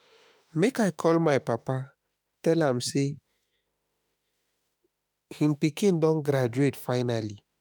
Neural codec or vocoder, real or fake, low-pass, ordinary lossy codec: autoencoder, 48 kHz, 32 numbers a frame, DAC-VAE, trained on Japanese speech; fake; none; none